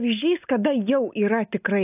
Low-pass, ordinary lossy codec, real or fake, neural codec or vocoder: 3.6 kHz; AAC, 32 kbps; real; none